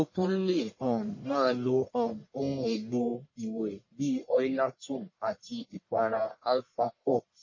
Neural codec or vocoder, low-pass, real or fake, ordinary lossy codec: codec, 44.1 kHz, 1.7 kbps, Pupu-Codec; 7.2 kHz; fake; MP3, 32 kbps